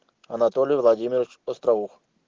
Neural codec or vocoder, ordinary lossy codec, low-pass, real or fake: none; Opus, 16 kbps; 7.2 kHz; real